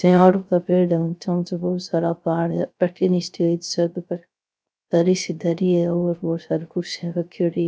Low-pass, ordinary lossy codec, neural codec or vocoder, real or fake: none; none; codec, 16 kHz, 0.3 kbps, FocalCodec; fake